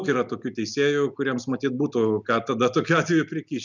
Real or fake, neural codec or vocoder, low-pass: real; none; 7.2 kHz